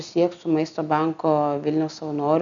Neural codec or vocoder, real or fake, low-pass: none; real; 7.2 kHz